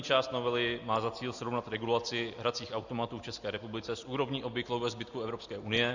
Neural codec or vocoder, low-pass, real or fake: none; 7.2 kHz; real